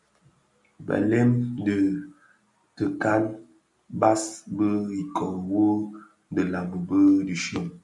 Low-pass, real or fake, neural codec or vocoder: 10.8 kHz; real; none